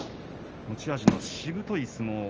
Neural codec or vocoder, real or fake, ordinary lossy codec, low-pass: none; real; Opus, 24 kbps; 7.2 kHz